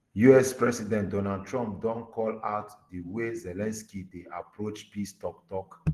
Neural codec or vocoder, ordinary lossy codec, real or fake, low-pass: none; Opus, 16 kbps; real; 14.4 kHz